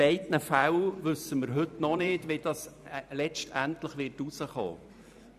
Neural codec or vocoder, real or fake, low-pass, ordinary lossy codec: vocoder, 44.1 kHz, 128 mel bands every 512 samples, BigVGAN v2; fake; 14.4 kHz; none